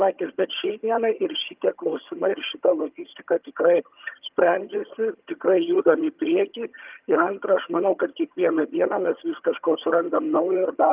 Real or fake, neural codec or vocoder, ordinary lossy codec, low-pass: fake; vocoder, 22.05 kHz, 80 mel bands, HiFi-GAN; Opus, 24 kbps; 3.6 kHz